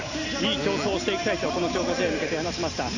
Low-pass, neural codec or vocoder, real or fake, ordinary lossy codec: 7.2 kHz; none; real; none